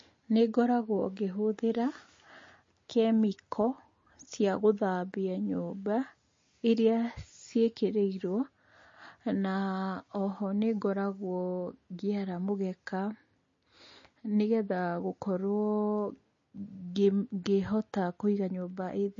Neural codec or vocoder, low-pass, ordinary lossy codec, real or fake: none; 7.2 kHz; MP3, 32 kbps; real